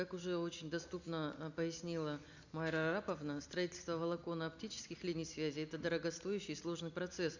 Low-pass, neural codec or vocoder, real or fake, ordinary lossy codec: 7.2 kHz; none; real; none